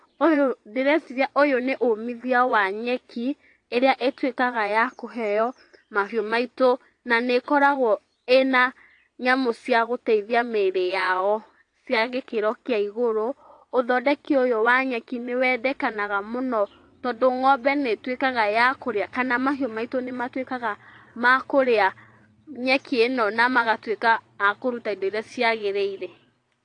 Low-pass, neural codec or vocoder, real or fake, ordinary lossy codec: 9.9 kHz; vocoder, 22.05 kHz, 80 mel bands, Vocos; fake; AAC, 48 kbps